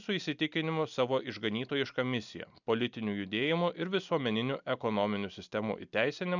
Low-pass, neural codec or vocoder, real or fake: 7.2 kHz; none; real